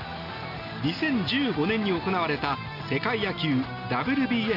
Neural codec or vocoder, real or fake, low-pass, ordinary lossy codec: none; real; 5.4 kHz; none